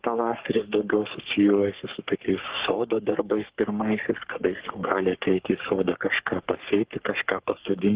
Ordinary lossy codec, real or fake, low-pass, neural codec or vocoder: Opus, 16 kbps; fake; 3.6 kHz; codec, 44.1 kHz, 3.4 kbps, Pupu-Codec